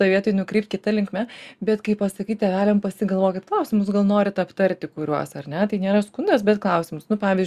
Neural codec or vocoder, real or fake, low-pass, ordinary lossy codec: none; real; 14.4 kHz; Opus, 64 kbps